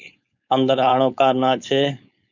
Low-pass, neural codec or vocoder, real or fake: 7.2 kHz; codec, 16 kHz, 4.8 kbps, FACodec; fake